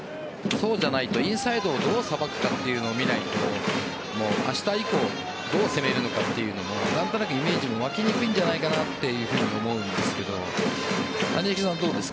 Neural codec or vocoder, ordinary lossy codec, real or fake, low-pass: none; none; real; none